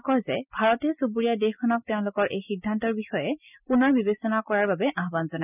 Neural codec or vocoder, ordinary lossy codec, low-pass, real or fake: none; none; 3.6 kHz; real